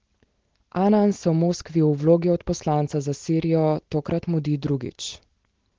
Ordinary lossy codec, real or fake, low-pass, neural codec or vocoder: Opus, 16 kbps; real; 7.2 kHz; none